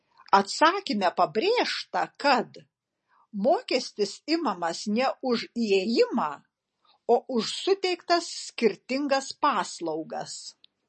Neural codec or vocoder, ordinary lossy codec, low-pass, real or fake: none; MP3, 32 kbps; 10.8 kHz; real